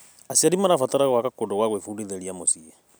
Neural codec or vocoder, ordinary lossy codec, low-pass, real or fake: none; none; none; real